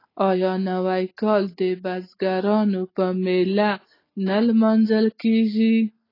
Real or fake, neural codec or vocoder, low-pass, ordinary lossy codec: real; none; 5.4 kHz; AAC, 24 kbps